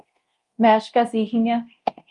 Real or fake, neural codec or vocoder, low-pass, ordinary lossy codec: fake; codec, 24 kHz, 0.9 kbps, DualCodec; 10.8 kHz; Opus, 32 kbps